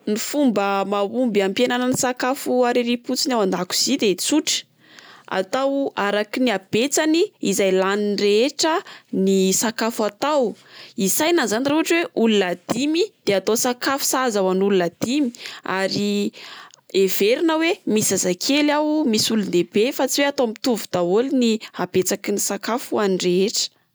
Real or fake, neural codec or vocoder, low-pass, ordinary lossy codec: real; none; none; none